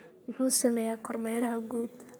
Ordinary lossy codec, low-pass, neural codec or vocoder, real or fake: none; none; codec, 44.1 kHz, 3.4 kbps, Pupu-Codec; fake